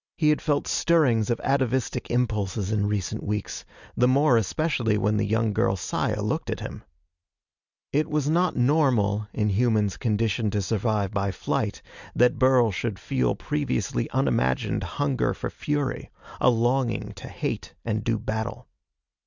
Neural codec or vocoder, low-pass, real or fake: none; 7.2 kHz; real